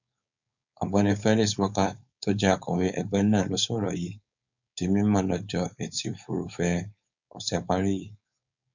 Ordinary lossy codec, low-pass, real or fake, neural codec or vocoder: none; 7.2 kHz; fake; codec, 16 kHz, 4.8 kbps, FACodec